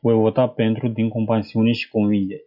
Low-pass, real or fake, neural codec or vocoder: 5.4 kHz; real; none